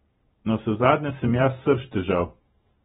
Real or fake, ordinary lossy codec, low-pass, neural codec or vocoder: fake; AAC, 16 kbps; 19.8 kHz; vocoder, 44.1 kHz, 128 mel bands every 512 samples, BigVGAN v2